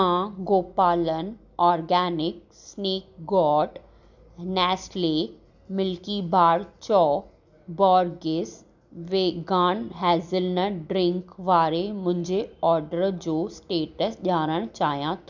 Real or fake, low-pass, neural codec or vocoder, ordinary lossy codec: real; 7.2 kHz; none; none